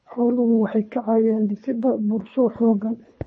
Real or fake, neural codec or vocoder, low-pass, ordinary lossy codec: fake; codec, 24 kHz, 3 kbps, HILCodec; 9.9 kHz; MP3, 32 kbps